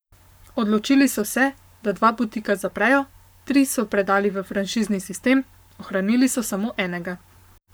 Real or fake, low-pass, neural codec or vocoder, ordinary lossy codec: fake; none; codec, 44.1 kHz, 7.8 kbps, Pupu-Codec; none